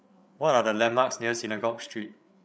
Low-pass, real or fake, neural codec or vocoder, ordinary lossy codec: none; fake; codec, 16 kHz, 8 kbps, FreqCodec, larger model; none